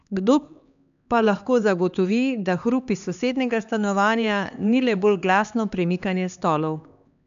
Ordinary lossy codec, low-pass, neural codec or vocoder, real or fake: none; 7.2 kHz; codec, 16 kHz, 2 kbps, X-Codec, HuBERT features, trained on LibriSpeech; fake